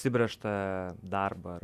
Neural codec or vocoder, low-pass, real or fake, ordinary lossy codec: none; 14.4 kHz; real; Opus, 64 kbps